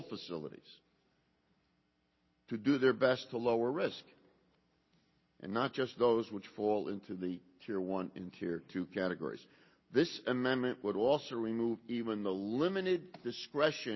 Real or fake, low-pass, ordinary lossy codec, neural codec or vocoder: real; 7.2 kHz; MP3, 24 kbps; none